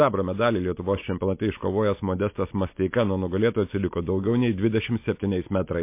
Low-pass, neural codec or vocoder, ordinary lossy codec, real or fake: 3.6 kHz; none; MP3, 24 kbps; real